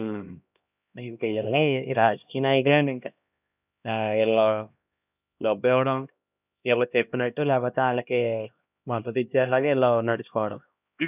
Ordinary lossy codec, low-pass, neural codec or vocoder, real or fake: none; 3.6 kHz; codec, 16 kHz, 1 kbps, X-Codec, HuBERT features, trained on LibriSpeech; fake